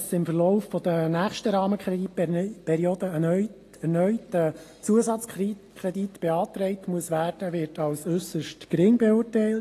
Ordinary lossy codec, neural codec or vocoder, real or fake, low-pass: AAC, 48 kbps; none; real; 14.4 kHz